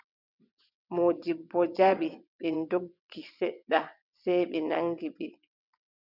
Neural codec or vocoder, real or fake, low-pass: vocoder, 22.05 kHz, 80 mel bands, WaveNeXt; fake; 5.4 kHz